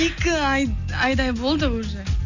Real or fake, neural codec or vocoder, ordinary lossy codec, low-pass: real; none; none; 7.2 kHz